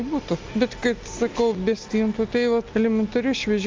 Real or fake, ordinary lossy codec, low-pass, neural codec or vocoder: real; Opus, 32 kbps; 7.2 kHz; none